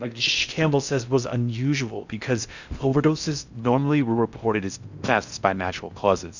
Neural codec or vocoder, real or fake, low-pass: codec, 16 kHz in and 24 kHz out, 0.6 kbps, FocalCodec, streaming, 2048 codes; fake; 7.2 kHz